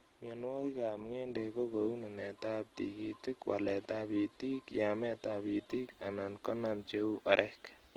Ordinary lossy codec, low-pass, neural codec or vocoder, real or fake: Opus, 16 kbps; 19.8 kHz; none; real